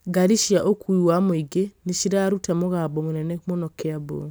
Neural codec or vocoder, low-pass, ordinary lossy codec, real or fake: none; none; none; real